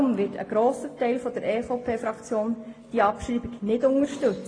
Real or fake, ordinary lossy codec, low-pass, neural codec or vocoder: real; AAC, 32 kbps; 9.9 kHz; none